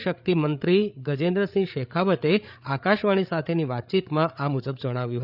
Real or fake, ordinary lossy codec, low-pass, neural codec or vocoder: fake; none; 5.4 kHz; codec, 16 kHz, 8 kbps, FreqCodec, larger model